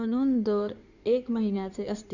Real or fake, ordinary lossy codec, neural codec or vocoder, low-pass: fake; none; codec, 16 kHz in and 24 kHz out, 2.2 kbps, FireRedTTS-2 codec; 7.2 kHz